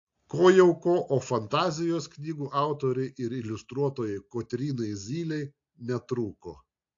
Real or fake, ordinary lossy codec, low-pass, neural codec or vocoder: real; AAC, 48 kbps; 7.2 kHz; none